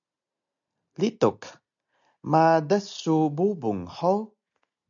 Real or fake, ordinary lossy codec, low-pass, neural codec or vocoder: real; MP3, 96 kbps; 7.2 kHz; none